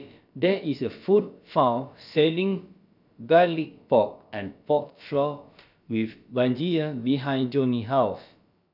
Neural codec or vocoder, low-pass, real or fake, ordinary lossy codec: codec, 16 kHz, about 1 kbps, DyCAST, with the encoder's durations; 5.4 kHz; fake; none